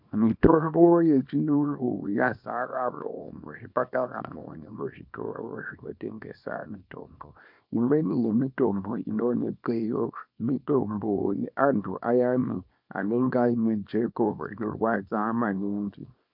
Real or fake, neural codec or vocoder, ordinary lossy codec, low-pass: fake; codec, 24 kHz, 0.9 kbps, WavTokenizer, small release; MP3, 48 kbps; 5.4 kHz